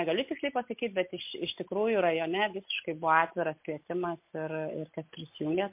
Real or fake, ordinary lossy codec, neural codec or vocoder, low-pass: real; MP3, 32 kbps; none; 3.6 kHz